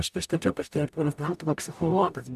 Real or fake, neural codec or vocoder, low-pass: fake; codec, 44.1 kHz, 0.9 kbps, DAC; 14.4 kHz